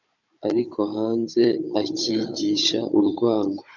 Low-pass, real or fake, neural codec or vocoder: 7.2 kHz; fake; codec, 16 kHz, 16 kbps, FunCodec, trained on Chinese and English, 50 frames a second